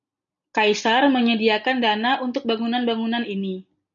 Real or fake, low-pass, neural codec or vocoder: real; 7.2 kHz; none